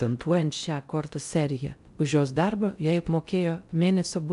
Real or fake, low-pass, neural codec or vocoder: fake; 10.8 kHz; codec, 16 kHz in and 24 kHz out, 0.6 kbps, FocalCodec, streaming, 4096 codes